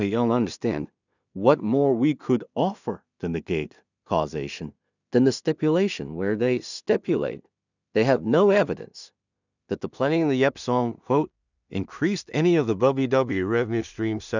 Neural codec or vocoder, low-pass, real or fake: codec, 16 kHz in and 24 kHz out, 0.4 kbps, LongCat-Audio-Codec, two codebook decoder; 7.2 kHz; fake